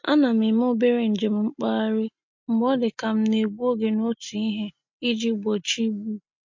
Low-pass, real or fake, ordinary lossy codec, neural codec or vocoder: 7.2 kHz; real; MP3, 48 kbps; none